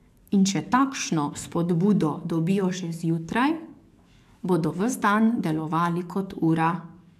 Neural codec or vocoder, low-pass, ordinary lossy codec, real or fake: codec, 44.1 kHz, 7.8 kbps, DAC; 14.4 kHz; AAC, 96 kbps; fake